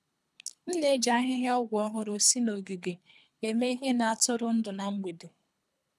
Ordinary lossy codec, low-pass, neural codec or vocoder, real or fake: none; none; codec, 24 kHz, 3 kbps, HILCodec; fake